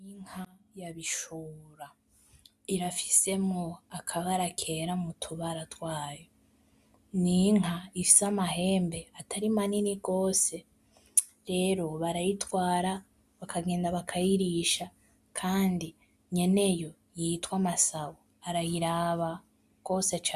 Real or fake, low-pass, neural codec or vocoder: real; 14.4 kHz; none